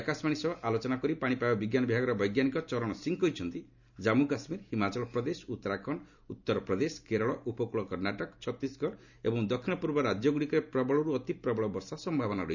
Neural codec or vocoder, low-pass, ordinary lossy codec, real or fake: none; 7.2 kHz; none; real